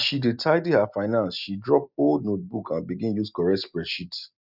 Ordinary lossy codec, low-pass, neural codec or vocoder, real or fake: none; 5.4 kHz; none; real